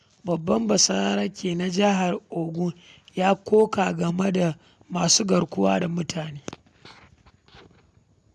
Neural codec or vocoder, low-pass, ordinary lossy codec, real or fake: none; none; none; real